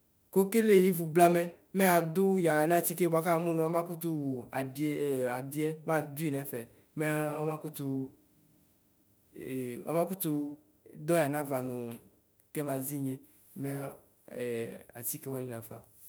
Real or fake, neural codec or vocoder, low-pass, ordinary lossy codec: fake; autoencoder, 48 kHz, 32 numbers a frame, DAC-VAE, trained on Japanese speech; none; none